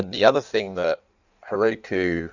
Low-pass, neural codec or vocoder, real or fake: 7.2 kHz; codec, 16 kHz in and 24 kHz out, 1.1 kbps, FireRedTTS-2 codec; fake